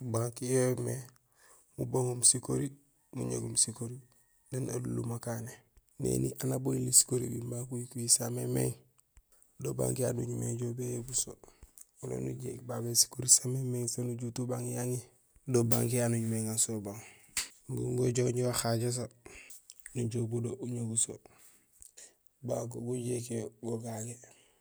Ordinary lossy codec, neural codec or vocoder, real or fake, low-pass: none; none; real; none